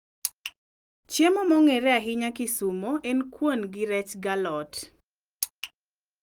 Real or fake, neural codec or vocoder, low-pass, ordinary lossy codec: real; none; 19.8 kHz; Opus, 32 kbps